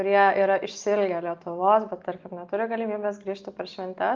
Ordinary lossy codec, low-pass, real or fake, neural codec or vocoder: Opus, 32 kbps; 7.2 kHz; real; none